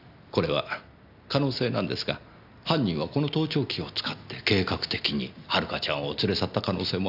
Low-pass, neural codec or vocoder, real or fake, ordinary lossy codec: 5.4 kHz; none; real; none